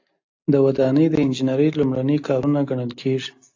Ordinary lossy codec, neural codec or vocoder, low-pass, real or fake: AAC, 48 kbps; none; 7.2 kHz; real